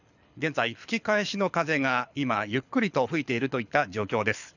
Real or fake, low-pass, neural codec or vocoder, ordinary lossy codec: fake; 7.2 kHz; codec, 24 kHz, 6 kbps, HILCodec; none